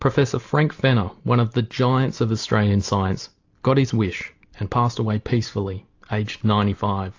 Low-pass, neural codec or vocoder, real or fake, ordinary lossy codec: 7.2 kHz; none; real; AAC, 48 kbps